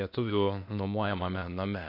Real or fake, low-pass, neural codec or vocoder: fake; 5.4 kHz; codec, 16 kHz, 0.8 kbps, ZipCodec